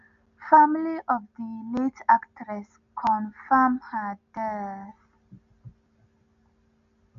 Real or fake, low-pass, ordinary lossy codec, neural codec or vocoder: real; 7.2 kHz; none; none